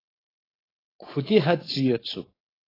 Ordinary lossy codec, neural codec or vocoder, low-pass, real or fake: AAC, 24 kbps; codec, 16 kHz, 4.8 kbps, FACodec; 5.4 kHz; fake